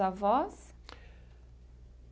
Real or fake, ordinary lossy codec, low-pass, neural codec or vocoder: real; none; none; none